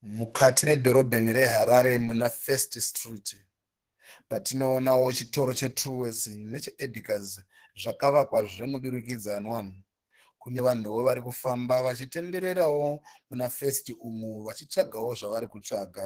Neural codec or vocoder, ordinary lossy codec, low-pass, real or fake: codec, 32 kHz, 1.9 kbps, SNAC; Opus, 16 kbps; 14.4 kHz; fake